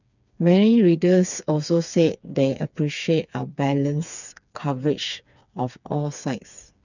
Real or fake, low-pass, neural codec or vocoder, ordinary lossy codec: fake; 7.2 kHz; codec, 16 kHz, 4 kbps, FreqCodec, smaller model; none